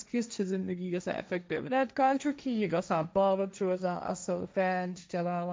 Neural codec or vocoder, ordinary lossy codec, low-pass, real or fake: codec, 16 kHz, 1.1 kbps, Voila-Tokenizer; none; none; fake